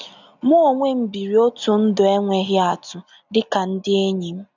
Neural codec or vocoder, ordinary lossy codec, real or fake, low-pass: none; none; real; 7.2 kHz